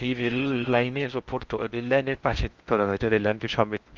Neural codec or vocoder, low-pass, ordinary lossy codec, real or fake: codec, 16 kHz in and 24 kHz out, 0.6 kbps, FocalCodec, streaming, 4096 codes; 7.2 kHz; Opus, 24 kbps; fake